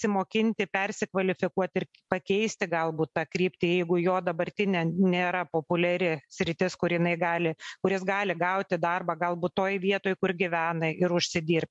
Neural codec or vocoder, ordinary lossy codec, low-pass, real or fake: none; MP3, 64 kbps; 10.8 kHz; real